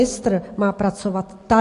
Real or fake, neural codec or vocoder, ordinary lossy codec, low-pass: real; none; AAC, 48 kbps; 10.8 kHz